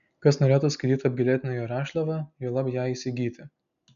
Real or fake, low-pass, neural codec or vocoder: real; 7.2 kHz; none